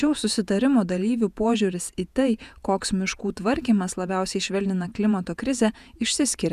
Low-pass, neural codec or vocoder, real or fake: 14.4 kHz; vocoder, 48 kHz, 128 mel bands, Vocos; fake